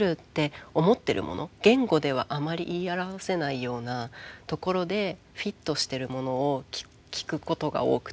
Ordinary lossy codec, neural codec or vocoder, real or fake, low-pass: none; none; real; none